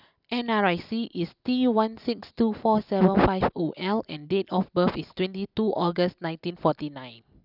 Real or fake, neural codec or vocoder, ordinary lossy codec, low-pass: real; none; none; 5.4 kHz